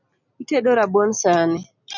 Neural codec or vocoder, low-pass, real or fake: none; 7.2 kHz; real